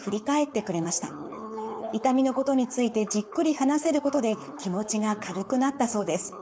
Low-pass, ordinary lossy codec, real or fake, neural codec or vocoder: none; none; fake; codec, 16 kHz, 4.8 kbps, FACodec